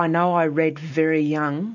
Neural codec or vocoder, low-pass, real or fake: none; 7.2 kHz; real